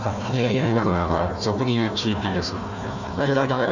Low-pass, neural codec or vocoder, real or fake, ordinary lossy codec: 7.2 kHz; codec, 16 kHz, 1 kbps, FunCodec, trained on Chinese and English, 50 frames a second; fake; none